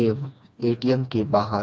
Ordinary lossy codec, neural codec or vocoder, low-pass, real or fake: none; codec, 16 kHz, 2 kbps, FreqCodec, smaller model; none; fake